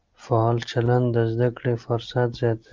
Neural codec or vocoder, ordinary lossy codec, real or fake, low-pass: none; Opus, 32 kbps; real; 7.2 kHz